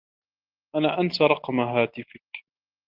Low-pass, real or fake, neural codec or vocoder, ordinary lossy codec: 5.4 kHz; real; none; Opus, 32 kbps